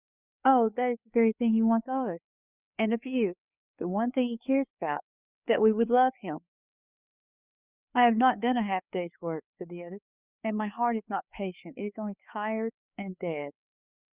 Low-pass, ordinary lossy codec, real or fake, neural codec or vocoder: 3.6 kHz; Opus, 64 kbps; fake; codec, 16 kHz, 4 kbps, FreqCodec, larger model